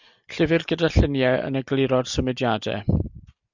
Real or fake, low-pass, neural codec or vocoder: real; 7.2 kHz; none